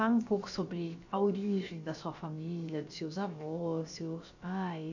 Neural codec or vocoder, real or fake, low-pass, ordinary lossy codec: codec, 16 kHz, about 1 kbps, DyCAST, with the encoder's durations; fake; 7.2 kHz; none